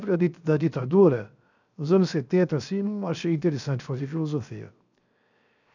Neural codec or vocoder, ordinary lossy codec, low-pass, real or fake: codec, 16 kHz, 0.7 kbps, FocalCodec; none; 7.2 kHz; fake